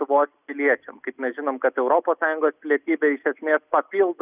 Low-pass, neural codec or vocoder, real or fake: 3.6 kHz; none; real